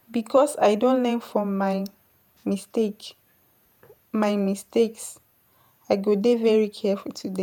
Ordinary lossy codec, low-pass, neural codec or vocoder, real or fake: none; none; vocoder, 48 kHz, 128 mel bands, Vocos; fake